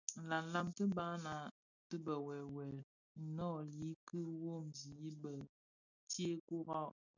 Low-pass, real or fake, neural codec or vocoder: 7.2 kHz; real; none